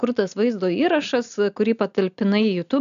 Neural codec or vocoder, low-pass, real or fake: none; 7.2 kHz; real